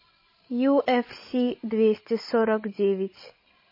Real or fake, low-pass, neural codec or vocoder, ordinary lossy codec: fake; 5.4 kHz; codec, 16 kHz, 16 kbps, FreqCodec, larger model; MP3, 24 kbps